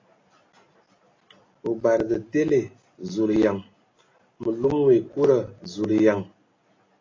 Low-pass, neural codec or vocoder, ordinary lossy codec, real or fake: 7.2 kHz; none; AAC, 32 kbps; real